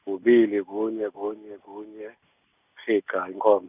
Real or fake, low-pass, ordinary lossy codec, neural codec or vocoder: real; 3.6 kHz; none; none